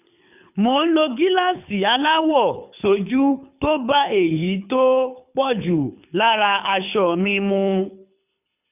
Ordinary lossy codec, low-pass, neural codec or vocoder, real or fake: none; 3.6 kHz; codec, 24 kHz, 6 kbps, HILCodec; fake